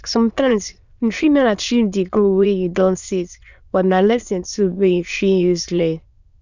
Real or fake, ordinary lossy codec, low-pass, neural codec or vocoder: fake; none; 7.2 kHz; autoencoder, 22.05 kHz, a latent of 192 numbers a frame, VITS, trained on many speakers